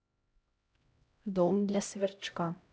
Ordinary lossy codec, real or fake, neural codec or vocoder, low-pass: none; fake; codec, 16 kHz, 0.5 kbps, X-Codec, HuBERT features, trained on LibriSpeech; none